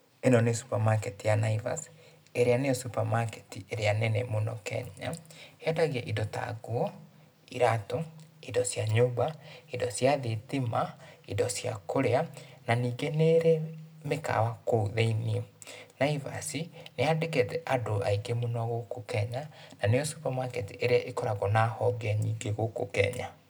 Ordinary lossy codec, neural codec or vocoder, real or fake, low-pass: none; none; real; none